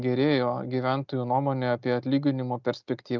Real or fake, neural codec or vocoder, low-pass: real; none; 7.2 kHz